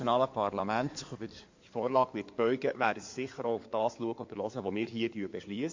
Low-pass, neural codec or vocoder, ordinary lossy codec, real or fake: 7.2 kHz; codec, 16 kHz in and 24 kHz out, 2.2 kbps, FireRedTTS-2 codec; MP3, 48 kbps; fake